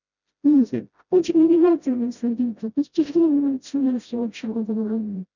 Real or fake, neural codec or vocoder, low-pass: fake; codec, 16 kHz, 0.5 kbps, FreqCodec, smaller model; 7.2 kHz